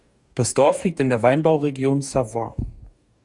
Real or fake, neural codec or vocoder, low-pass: fake; codec, 44.1 kHz, 2.6 kbps, DAC; 10.8 kHz